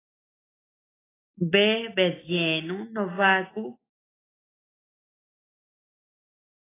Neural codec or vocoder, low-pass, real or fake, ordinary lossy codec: none; 3.6 kHz; real; AAC, 16 kbps